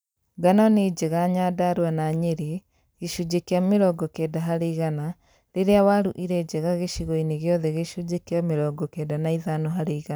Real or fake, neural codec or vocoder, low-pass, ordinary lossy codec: fake; vocoder, 44.1 kHz, 128 mel bands every 256 samples, BigVGAN v2; none; none